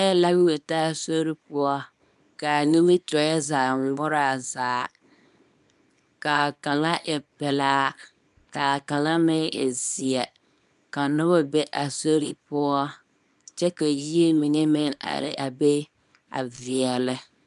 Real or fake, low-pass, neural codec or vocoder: fake; 10.8 kHz; codec, 24 kHz, 0.9 kbps, WavTokenizer, small release